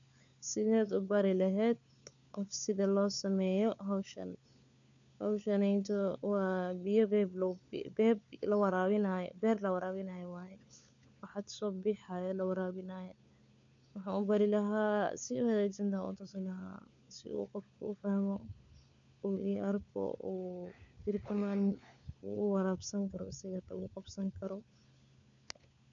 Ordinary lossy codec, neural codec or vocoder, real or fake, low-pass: none; codec, 16 kHz, 4 kbps, FunCodec, trained on LibriTTS, 50 frames a second; fake; 7.2 kHz